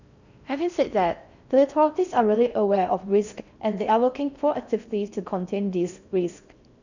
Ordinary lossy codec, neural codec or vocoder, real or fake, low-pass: none; codec, 16 kHz in and 24 kHz out, 0.6 kbps, FocalCodec, streaming, 4096 codes; fake; 7.2 kHz